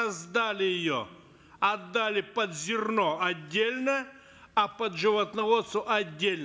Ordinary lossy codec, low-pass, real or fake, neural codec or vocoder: none; none; real; none